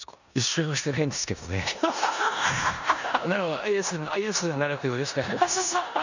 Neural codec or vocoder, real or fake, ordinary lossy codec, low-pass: codec, 16 kHz in and 24 kHz out, 0.9 kbps, LongCat-Audio-Codec, four codebook decoder; fake; none; 7.2 kHz